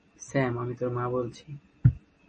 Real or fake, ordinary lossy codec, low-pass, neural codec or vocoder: real; MP3, 32 kbps; 10.8 kHz; none